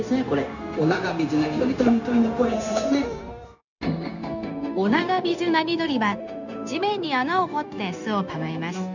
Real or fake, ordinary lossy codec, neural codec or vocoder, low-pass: fake; none; codec, 16 kHz, 0.9 kbps, LongCat-Audio-Codec; 7.2 kHz